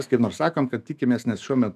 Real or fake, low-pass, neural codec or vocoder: fake; 14.4 kHz; autoencoder, 48 kHz, 128 numbers a frame, DAC-VAE, trained on Japanese speech